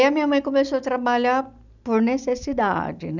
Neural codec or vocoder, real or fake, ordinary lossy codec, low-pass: none; real; none; 7.2 kHz